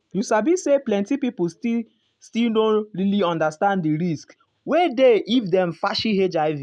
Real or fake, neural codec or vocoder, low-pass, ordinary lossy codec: real; none; 9.9 kHz; none